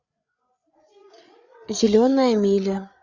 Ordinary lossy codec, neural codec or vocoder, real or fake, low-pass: Opus, 64 kbps; codec, 16 kHz, 8 kbps, FreqCodec, larger model; fake; 7.2 kHz